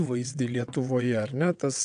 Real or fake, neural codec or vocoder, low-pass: fake; vocoder, 22.05 kHz, 80 mel bands, WaveNeXt; 9.9 kHz